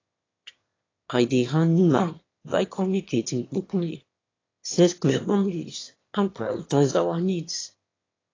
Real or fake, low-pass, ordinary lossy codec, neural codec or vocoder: fake; 7.2 kHz; AAC, 32 kbps; autoencoder, 22.05 kHz, a latent of 192 numbers a frame, VITS, trained on one speaker